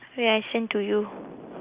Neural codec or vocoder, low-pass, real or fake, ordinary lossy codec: none; 3.6 kHz; real; Opus, 64 kbps